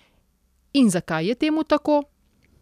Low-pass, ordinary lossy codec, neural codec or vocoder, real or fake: 14.4 kHz; none; none; real